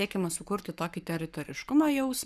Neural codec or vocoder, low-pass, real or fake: codec, 44.1 kHz, 7.8 kbps, Pupu-Codec; 14.4 kHz; fake